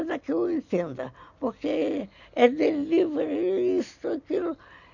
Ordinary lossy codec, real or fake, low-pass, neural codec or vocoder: none; real; 7.2 kHz; none